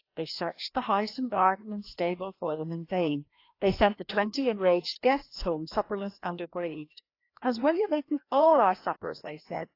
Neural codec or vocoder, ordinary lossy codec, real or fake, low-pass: codec, 16 kHz, 1 kbps, FreqCodec, larger model; AAC, 32 kbps; fake; 5.4 kHz